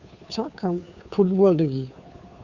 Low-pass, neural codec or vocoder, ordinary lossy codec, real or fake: 7.2 kHz; codec, 16 kHz, 2 kbps, FunCodec, trained on Chinese and English, 25 frames a second; Opus, 64 kbps; fake